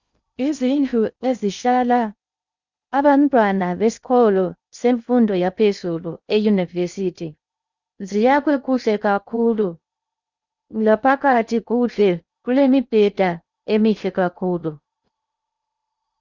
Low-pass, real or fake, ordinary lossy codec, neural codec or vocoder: 7.2 kHz; fake; Opus, 64 kbps; codec, 16 kHz in and 24 kHz out, 0.6 kbps, FocalCodec, streaming, 2048 codes